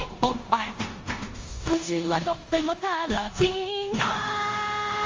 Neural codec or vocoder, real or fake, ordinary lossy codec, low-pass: codec, 16 kHz in and 24 kHz out, 0.9 kbps, LongCat-Audio-Codec, four codebook decoder; fake; Opus, 32 kbps; 7.2 kHz